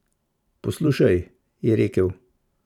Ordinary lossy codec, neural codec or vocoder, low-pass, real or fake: none; vocoder, 44.1 kHz, 128 mel bands every 512 samples, BigVGAN v2; 19.8 kHz; fake